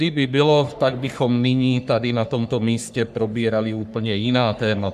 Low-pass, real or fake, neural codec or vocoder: 14.4 kHz; fake; codec, 44.1 kHz, 3.4 kbps, Pupu-Codec